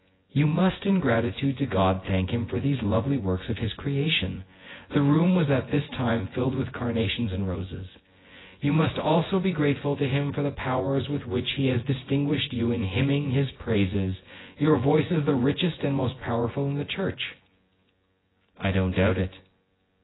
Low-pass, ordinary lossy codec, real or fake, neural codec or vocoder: 7.2 kHz; AAC, 16 kbps; fake; vocoder, 24 kHz, 100 mel bands, Vocos